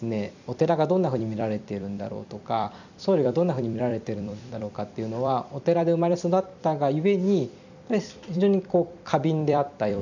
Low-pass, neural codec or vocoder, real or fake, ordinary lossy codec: 7.2 kHz; vocoder, 44.1 kHz, 128 mel bands every 256 samples, BigVGAN v2; fake; none